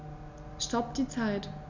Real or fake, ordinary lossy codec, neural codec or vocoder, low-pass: real; none; none; 7.2 kHz